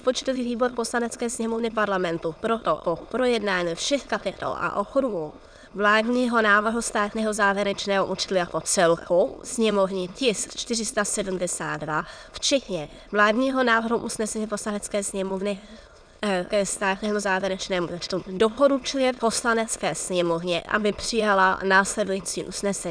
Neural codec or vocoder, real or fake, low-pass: autoencoder, 22.05 kHz, a latent of 192 numbers a frame, VITS, trained on many speakers; fake; 9.9 kHz